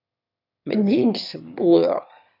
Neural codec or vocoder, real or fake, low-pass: autoencoder, 22.05 kHz, a latent of 192 numbers a frame, VITS, trained on one speaker; fake; 5.4 kHz